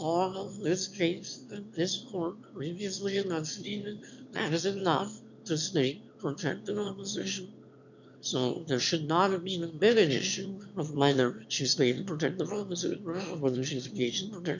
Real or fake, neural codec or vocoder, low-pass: fake; autoencoder, 22.05 kHz, a latent of 192 numbers a frame, VITS, trained on one speaker; 7.2 kHz